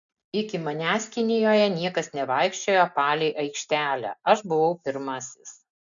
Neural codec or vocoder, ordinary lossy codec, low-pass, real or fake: none; MP3, 96 kbps; 7.2 kHz; real